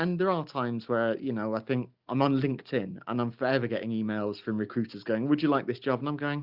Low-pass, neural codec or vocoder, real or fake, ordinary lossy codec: 5.4 kHz; none; real; Opus, 64 kbps